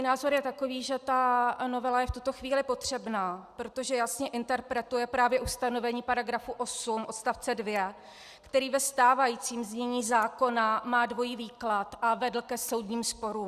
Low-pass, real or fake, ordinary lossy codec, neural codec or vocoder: 14.4 kHz; real; Opus, 64 kbps; none